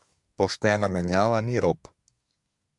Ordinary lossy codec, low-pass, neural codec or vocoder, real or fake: MP3, 96 kbps; 10.8 kHz; codec, 24 kHz, 1 kbps, SNAC; fake